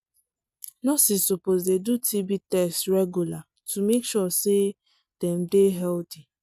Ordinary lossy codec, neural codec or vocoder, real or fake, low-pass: none; none; real; 14.4 kHz